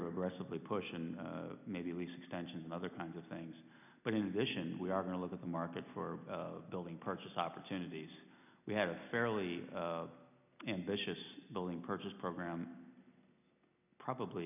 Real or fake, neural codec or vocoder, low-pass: real; none; 3.6 kHz